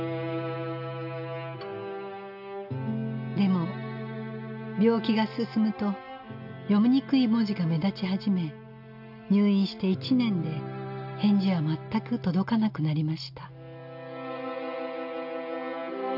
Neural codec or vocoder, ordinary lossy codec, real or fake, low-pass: none; none; real; 5.4 kHz